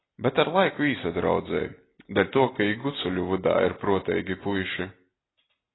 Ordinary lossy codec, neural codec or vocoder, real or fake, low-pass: AAC, 16 kbps; none; real; 7.2 kHz